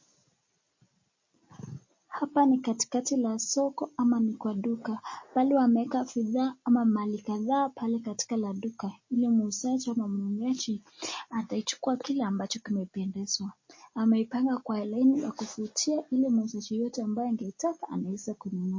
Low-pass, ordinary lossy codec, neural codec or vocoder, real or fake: 7.2 kHz; MP3, 32 kbps; none; real